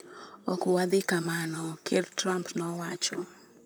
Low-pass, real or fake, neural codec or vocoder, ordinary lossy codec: none; fake; vocoder, 44.1 kHz, 128 mel bands, Pupu-Vocoder; none